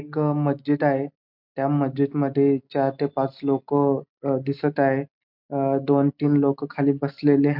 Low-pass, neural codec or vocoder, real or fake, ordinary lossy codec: 5.4 kHz; none; real; MP3, 48 kbps